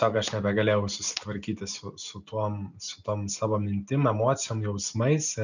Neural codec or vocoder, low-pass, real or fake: none; 7.2 kHz; real